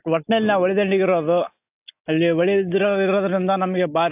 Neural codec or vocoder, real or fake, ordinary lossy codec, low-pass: none; real; AAC, 32 kbps; 3.6 kHz